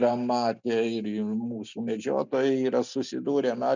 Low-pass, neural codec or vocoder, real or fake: 7.2 kHz; none; real